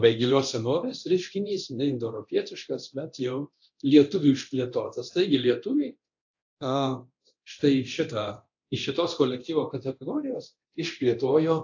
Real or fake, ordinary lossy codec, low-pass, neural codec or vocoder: fake; AAC, 48 kbps; 7.2 kHz; codec, 24 kHz, 0.9 kbps, DualCodec